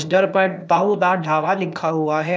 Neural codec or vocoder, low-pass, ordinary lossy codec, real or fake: codec, 16 kHz, 0.8 kbps, ZipCodec; none; none; fake